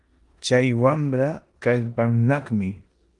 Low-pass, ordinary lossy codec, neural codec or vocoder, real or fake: 10.8 kHz; Opus, 24 kbps; codec, 16 kHz in and 24 kHz out, 0.9 kbps, LongCat-Audio-Codec, four codebook decoder; fake